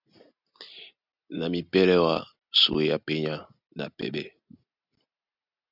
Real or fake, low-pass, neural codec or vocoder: real; 5.4 kHz; none